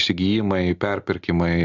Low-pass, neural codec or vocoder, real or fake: 7.2 kHz; none; real